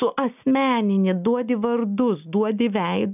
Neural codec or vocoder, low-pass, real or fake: none; 3.6 kHz; real